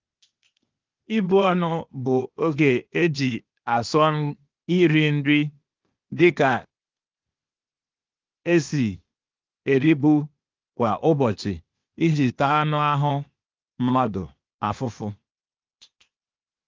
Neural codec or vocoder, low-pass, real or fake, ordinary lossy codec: codec, 16 kHz, 0.8 kbps, ZipCodec; 7.2 kHz; fake; Opus, 32 kbps